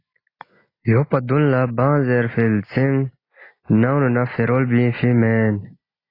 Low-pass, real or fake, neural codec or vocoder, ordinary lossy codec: 5.4 kHz; real; none; AAC, 24 kbps